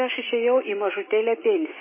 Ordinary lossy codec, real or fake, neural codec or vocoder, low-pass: MP3, 16 kbps; real; none; 3.6 kHz